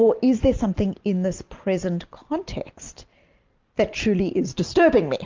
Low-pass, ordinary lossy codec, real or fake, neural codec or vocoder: 7.2 kHz; Opus, 24 kbps; real; none